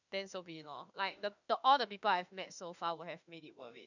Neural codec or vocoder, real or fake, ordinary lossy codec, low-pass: autoencoder, 48 kHz, 32 numbers a frame, DAC-VAE, trained on Japanese speech; fake; none; 7.2 kHz